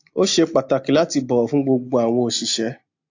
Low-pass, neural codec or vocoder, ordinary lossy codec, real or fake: 7.2 kHz; none; AAC, 48 kbps; real